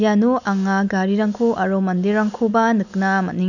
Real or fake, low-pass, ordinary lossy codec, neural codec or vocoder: real; 7.2 kHz; none; none